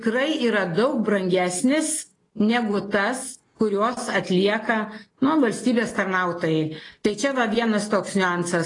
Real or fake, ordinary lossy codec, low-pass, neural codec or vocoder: real; AAC, 32 kbps; 10.8 kHz; none